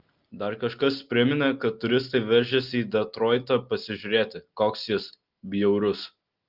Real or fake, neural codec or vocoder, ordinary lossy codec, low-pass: real; none; Opus, 32 kbps; 5.4 kHz